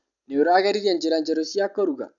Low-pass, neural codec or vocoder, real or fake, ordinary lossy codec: 7.2 kHz; none; real; none